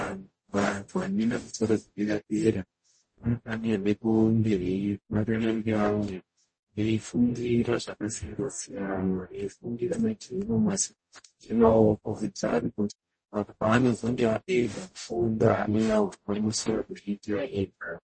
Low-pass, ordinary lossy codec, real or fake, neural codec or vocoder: 9.9 kHz; MP3, 32 kbps; fake; codec, 44.1 kHz, 0.9 kbps, DAC